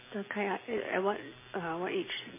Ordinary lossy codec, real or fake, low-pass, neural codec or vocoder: MP3, 16 kbps; real; 3.6 kHz; none